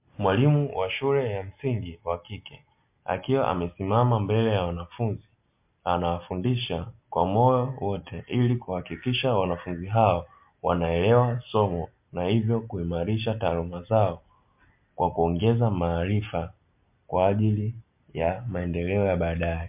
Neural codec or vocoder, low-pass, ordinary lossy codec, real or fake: none; 3.6 kHz; MP3, 32 kbps; real